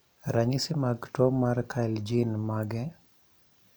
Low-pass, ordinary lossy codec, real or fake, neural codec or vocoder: none; none; real; none